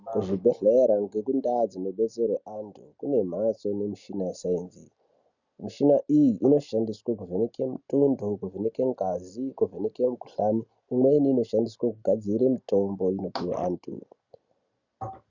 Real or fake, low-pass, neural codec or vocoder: real; 7.2 kHz; none